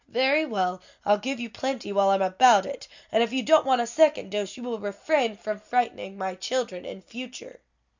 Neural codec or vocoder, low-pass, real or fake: none; 7.2 kHz; real